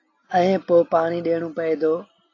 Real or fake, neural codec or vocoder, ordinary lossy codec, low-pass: real; none; AAC, 32 kbps; 7.2 kHz